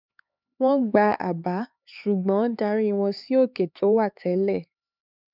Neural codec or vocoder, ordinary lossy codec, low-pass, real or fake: codec, 16 kHz, 4 kbps, X-Codec, HuBERT features, trained on LibriSpeech; none; 5.4 kHz; fake